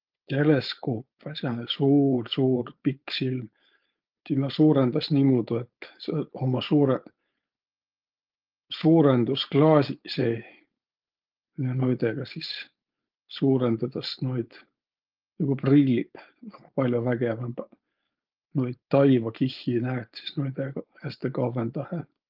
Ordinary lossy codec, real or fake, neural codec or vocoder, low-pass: Opus, 24 kbps; fake; codec, 16 kHz, 4.8 kbps, FACodec; 5.4 kHz